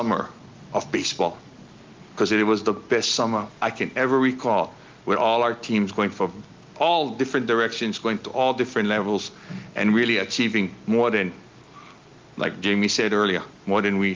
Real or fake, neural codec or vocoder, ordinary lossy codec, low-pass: real; none; Opus, 24 kbps; 7.2 kHz